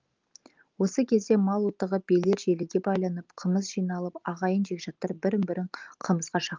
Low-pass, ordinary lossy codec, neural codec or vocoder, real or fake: 7.2 kHz; Opus, 32 kbps; none; real